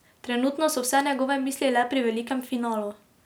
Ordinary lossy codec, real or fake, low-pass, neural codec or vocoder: none; real; none; none